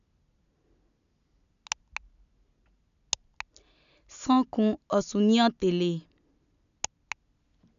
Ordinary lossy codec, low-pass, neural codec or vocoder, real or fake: none; 7.2 kHz; none; real